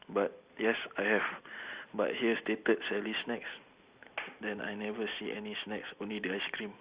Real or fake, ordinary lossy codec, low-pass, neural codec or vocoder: real; Opus, 64 kbps; 3.6 kHz; none